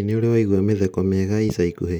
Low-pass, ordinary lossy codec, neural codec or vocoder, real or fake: none; none; none; real